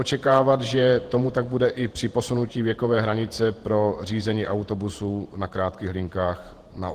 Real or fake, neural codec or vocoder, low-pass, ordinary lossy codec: fake; vocoder, 48 kHz, 128 mel bands, Vocos; 14.4 kHz; Opus, 16 kbps